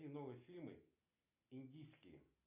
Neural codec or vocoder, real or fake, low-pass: none; real; 3.6 kHz